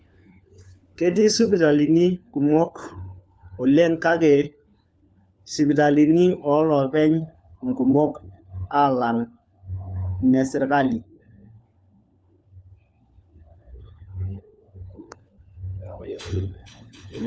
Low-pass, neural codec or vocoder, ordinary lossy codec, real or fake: none; codec, 16 kHz, 4 kbps, FunCodec, trained on LibriTTS, 50 frames a second; none; fake